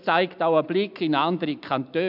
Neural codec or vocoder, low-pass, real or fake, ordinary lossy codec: none; 5.4 kHz; real; none